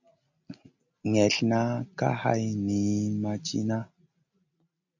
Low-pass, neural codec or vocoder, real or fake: 7.2 kHz; none; real